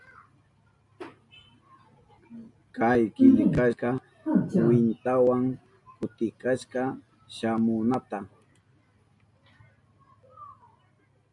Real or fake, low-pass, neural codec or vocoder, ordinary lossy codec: real; 10.8 kHz; none; MP3, 64 kbps